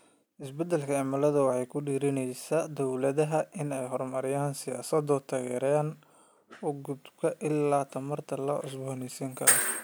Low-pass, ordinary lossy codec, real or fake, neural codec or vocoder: none; none; real; none